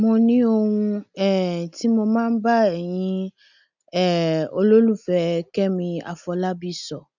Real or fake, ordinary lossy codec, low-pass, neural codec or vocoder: real; none; 7.2 kHz; none